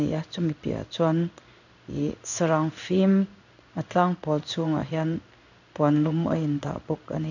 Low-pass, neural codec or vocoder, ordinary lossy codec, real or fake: 7.2 kHz; codec, 16 kHz in and 24 kHz out, 1 kbps, XY-Tokenizer; none; fake